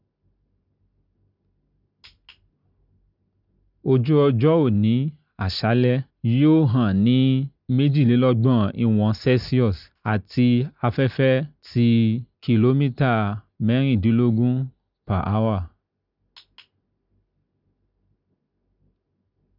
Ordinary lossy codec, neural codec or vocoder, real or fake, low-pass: none; none; real; 5.4 kHz